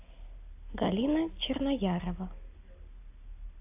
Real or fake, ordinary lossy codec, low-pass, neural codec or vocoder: real; AAC, 32 kbps; 3.6 kHz; none